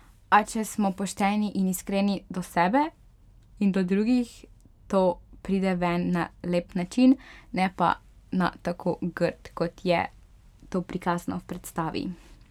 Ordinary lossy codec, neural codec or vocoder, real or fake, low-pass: none; none; real; 19.8 kHz